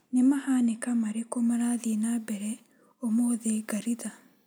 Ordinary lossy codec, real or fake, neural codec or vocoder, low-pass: none; real; none; none